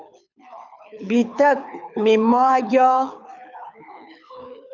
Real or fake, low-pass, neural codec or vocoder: fake; 7.2 kHz; codec, 24 kHz, 6 kbps, HILCodec